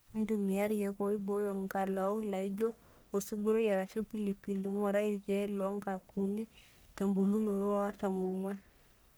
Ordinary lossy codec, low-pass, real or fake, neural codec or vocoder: none; none; fake; codec, 44.1 kHz, 1.7 kbps, Pupu-Codec